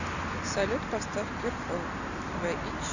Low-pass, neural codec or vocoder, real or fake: 7.2 kHz; none; real